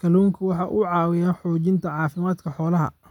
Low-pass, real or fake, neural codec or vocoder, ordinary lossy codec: 19.8 kHz; real; none; none